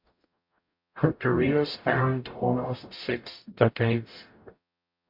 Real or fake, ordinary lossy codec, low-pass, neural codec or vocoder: fake; MP3, 48 kbps; 5.4 kHz; codec, 44.1 kHz, 0.9 kbps, DAC